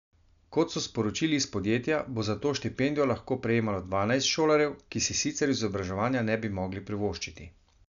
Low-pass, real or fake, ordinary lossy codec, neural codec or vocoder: 7.2 kHz; real; none; none